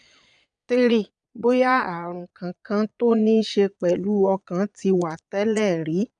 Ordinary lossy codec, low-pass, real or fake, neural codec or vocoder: none; 9.9 kHz; fake; vocoder, 22.05 kHz, 80 mel bands, Vocos